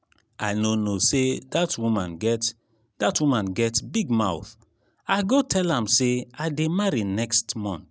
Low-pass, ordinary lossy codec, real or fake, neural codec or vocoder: none; none; real; none